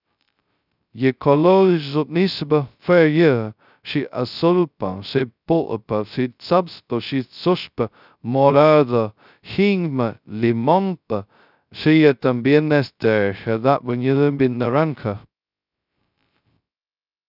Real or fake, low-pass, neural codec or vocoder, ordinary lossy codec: fake; 5.4 kHz; codec, 16 kHz, 0.2 kbps, FocalCodec; none